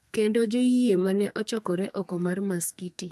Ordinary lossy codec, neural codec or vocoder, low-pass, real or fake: none; codec, 32 kHz, 1.9 kbps, SNAC; 14.4 kHz; fake